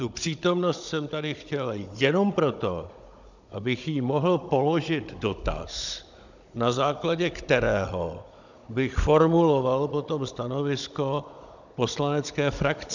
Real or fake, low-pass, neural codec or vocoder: fake; 7.2 kHz; codec, 16 kHz, 16 kbps, FunCodec, trained on Chinese and English, 50 frames a second